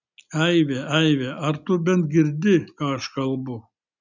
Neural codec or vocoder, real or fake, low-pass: none; real; 7.2 kHz